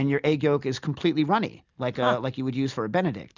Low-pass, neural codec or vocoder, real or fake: 7.2 kHz; none; real